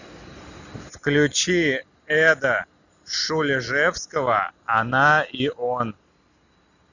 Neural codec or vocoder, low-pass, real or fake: none; 7.2 kHz; real